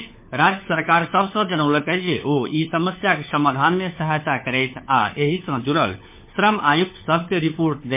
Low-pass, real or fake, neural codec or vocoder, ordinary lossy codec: 3.6 kHz; fake; codec, 16 kHz, 4 kbps, FunCodec, trained on LibriTTS, 50 frames a second; MP3, 24 kbps